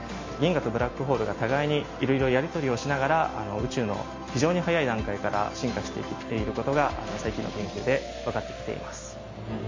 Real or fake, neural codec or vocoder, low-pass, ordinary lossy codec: real; none; 7.2 kHz; MP3, 32 kbps